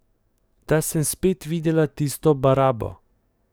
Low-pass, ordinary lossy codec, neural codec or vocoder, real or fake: none; none; codec, 44.1 kHz, 7.8 kbps, DAC; fake